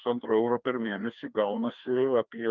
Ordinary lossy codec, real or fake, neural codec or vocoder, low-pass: Opus, 24 kbps; fake; codec, 16 kHz, 2 kbps, FreqCodec, larger model; 7.2 kHz